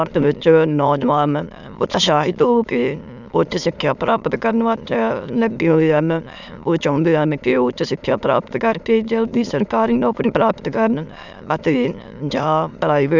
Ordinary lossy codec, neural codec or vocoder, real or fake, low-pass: none; autoencoder, 22.05 kHz, a latent of 192 numbers a frame, VITS, trained on many speakers; fake; 7.2 kHz